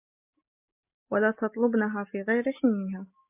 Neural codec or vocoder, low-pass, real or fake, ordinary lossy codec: none; 3.6 kHz; real; MP3, 24 kbps